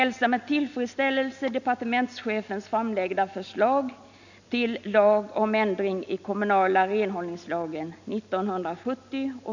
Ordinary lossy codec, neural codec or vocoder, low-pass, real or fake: none; none; 7.2 kHz; real